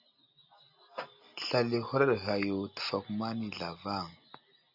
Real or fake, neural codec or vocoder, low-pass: real; none; 5.4 kHz